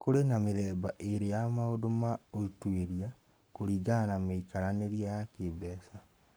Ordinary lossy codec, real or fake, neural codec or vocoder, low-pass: none; fake; codec, 44.1 kHz, 7.8 kbps, Pupu-Codec; none